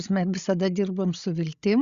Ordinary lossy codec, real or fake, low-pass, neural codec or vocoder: Opus, 64 kbps; fake; 7.2 kHz; codec, 16 kHz, 16 kbps, FreqCodec, larger model